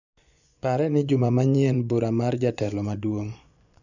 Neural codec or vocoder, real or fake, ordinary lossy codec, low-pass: vocoder, 24 kHz, 100 mel bands, Vocos; fake; none; 7.2 kHz